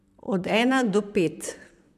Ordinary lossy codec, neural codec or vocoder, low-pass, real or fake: none; vocoder, 44.1 kHz, 128 mel bands, Pupu-Vocoder; 14.4 kHz; fake